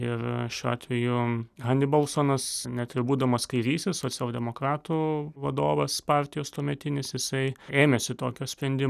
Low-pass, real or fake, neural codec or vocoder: 14.4 kHz; real; none